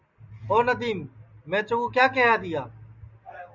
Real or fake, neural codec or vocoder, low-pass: real; none; 7.2 kHz